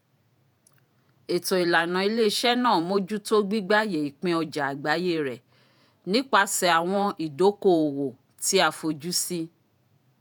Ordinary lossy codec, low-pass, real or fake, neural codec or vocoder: none; none; fake; vocoder, 48 kHz, 128 mel bands, Vocos